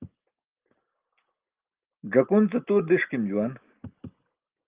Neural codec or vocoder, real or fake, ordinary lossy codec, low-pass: none; real; Opus, 32 kbps; 3.6 kHz